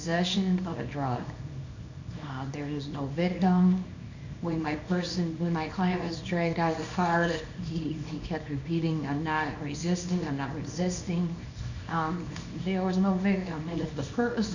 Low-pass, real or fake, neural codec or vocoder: 7.2 kHz; fake; codec, 24 kHz, 0.9 kbps, WavTokenizer, small release